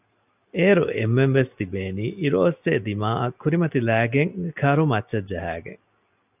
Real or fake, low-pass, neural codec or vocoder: real; 3.6 kHz; none